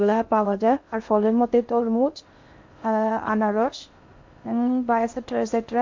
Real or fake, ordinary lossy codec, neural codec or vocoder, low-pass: fake; MP3, 48 kbps; codec, 16 kHz in and 24 kHz out, 0.6 kbps, FocalCodec, streaming, 2048 codes; 7.2 kHz